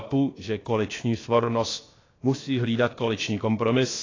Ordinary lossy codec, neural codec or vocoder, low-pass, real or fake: AAC, 32 kbps; codec, 16 kHz, about 1 kbps, DyCAST, with the encoder's durations; 7.2 kHz; fake